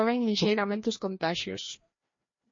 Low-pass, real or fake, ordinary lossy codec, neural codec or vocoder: 7.2 kHz; fake; MP3, 32 kbps; codec, 16 kHz, 1 kbps, FreqCodec, larger model